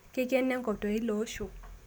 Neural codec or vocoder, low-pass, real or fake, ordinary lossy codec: none; none; real; none